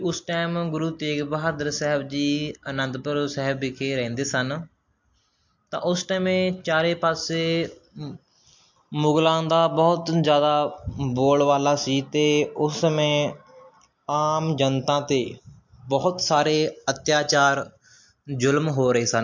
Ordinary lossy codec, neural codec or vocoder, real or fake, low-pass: MP3, 48 kbps; none; real; 7.2 kHz